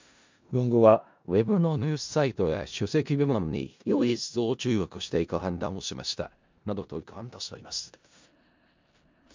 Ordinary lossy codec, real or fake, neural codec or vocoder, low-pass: MP3, 64 kbps; fake; codec, 16 kHz in and 24 kHz out, 0.4 kbps, LongCat-Audio-Codec, four codebook decoder; 7.2 kHz